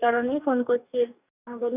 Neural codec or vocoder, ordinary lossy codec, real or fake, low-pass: codec, 44.1 kHz, 2.6 kbps, DAC; none; fake; 3.6 kHz